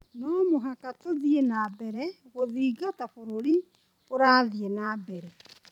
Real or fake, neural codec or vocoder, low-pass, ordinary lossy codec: fake; vocoder, 44.1 kHz, 128 mel bands every 512 samples, BigVGAN v2; 19.8 kHz; none